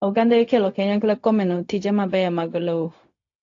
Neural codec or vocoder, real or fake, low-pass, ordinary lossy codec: codec, 16 kHz, 0.4 kbps, LongCat-Audio-Codec; fake; 7.2 kHz; AAC, 48 kbps